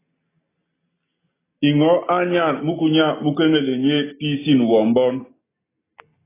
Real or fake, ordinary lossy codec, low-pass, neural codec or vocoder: real; AAC, 16 kbps; 3.6 kHz; none